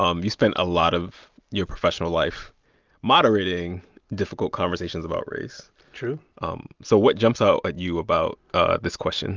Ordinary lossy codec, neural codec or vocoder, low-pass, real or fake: Opus, 24 kbps; none; 7.2 kHz; real